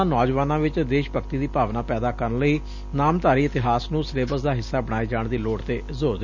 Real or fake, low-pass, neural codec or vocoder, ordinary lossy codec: real; 7.2 kHz; none; none